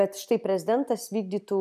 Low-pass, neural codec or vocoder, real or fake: 14.4 kHz; none; real